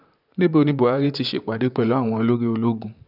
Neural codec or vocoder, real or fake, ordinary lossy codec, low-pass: vocoder, 44.1 kHz, 128 mel bands, Pupu-Vocoder; fake; none; 5.4 kHz